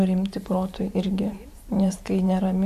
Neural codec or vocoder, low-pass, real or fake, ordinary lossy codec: vocoder, 44.1 kHz, 128 mel bands every 512 samples, BigVGAN v2; 14.4 kHz; fake; MP3, 64 kbps